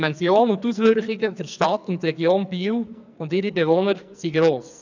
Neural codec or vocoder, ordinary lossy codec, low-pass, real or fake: codec, 44.1 kHz, 2.6 kbps, SNAC; none; 7.2 kHz; fake